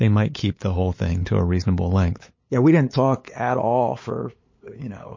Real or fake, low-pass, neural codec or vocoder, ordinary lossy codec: fake; 7.2 kHz; codec, 16 kHz, 8 kbps, FunCodec, trained on LibriTTS, 25 frames a second; MP3, 32 kbps